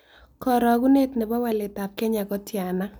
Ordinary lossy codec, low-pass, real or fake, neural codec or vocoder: none; none; real; none